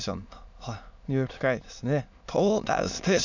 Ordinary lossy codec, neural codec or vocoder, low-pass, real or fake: none; autoencoder, 22.05 kHz, a latent of 192 numbers a frame, VITS, trained on many speakers; 7.2 kHz; fake